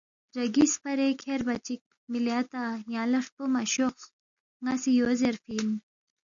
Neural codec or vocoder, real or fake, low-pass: none; real; 7.2 kHz